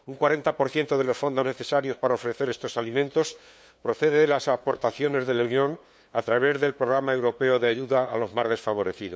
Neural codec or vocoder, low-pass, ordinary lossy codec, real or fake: codec, 16 kHz, 2 kbps, FunCodec, trained on LibriTTS, 25 frames a second; none; none; fake